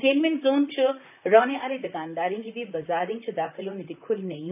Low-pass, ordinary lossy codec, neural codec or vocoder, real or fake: 3.6 kHz; none; vocoder, 44.1 kHz, 128 mel bands, Pupu-Vocoder; fake